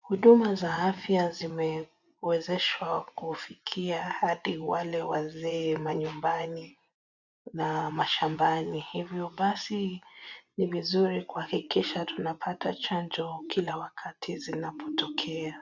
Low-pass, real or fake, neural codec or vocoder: 7.2 kHz; fake; vocoder, 24 kHz, 100 mel bands, Vocos